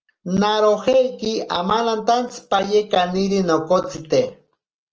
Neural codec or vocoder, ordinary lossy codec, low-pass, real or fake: none; Opus, 24 kbps; 7.2 kHz; real